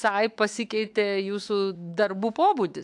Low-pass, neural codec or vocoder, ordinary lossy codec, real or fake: 10.8 kHz; autoencoder, 48 kHz, 128 numbers a frame, DAC-VAE, trained on Japanese speech; AAC, 64 kbps; fake